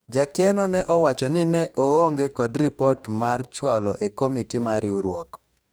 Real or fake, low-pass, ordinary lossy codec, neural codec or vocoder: fake; none; none; codec, 44.1 kHz, 2.6 kbps, DAC